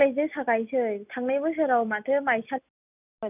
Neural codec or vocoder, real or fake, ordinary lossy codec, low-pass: none; real; none; 3.6 kHz